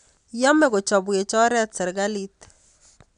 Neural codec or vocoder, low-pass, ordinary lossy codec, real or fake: none; 9.9 kHz; none; real